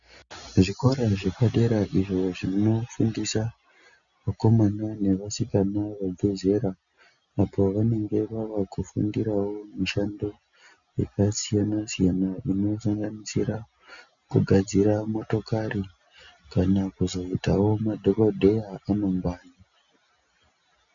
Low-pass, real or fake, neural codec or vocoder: 7.2 kHz; real; none